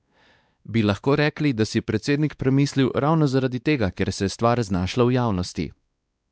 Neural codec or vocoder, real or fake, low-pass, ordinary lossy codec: codec, 16 kHz, 2 kbps, X-Codec, WavLM features, trained on Multilingual LibriSpeech; fake; none; none